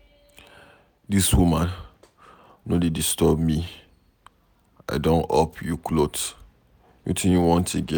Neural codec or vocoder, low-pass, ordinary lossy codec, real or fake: none; none; none; real